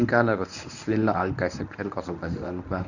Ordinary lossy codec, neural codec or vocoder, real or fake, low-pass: none; codec, 24 kHz, 0.9 kbps, WavTokenizer, medium speech release version 1; fake; 7.2 kHz